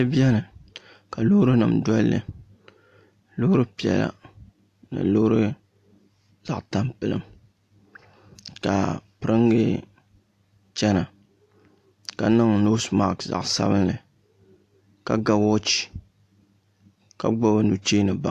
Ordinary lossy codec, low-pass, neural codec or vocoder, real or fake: AAC, 64 kbps; 14.4 kHz; none; real